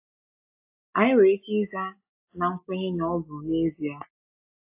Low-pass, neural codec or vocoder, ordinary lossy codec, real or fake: 3.6 kHz; none; AAC, 24 kbps; real